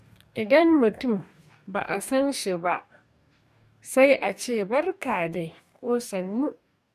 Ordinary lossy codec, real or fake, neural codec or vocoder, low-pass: none; fake; codec, 44.1 kHz, 2.6 kbps, DAC; 14.4 kHz